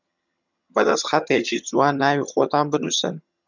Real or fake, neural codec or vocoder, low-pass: fake; vocoder, 22.05 kHz, 80 mel bands, HiFi-GAN; 7.2 kHz